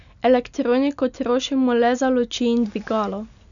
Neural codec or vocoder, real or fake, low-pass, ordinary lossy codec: none; real; 7.2 kHz; none